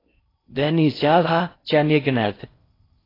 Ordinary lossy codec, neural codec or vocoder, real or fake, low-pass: AAC, 24 kbps; codec, 16 kHz in and 24 kHz out, 0.6 kbps, FocalCodec, streaming, 4096 codes; fake; 5.4 kHz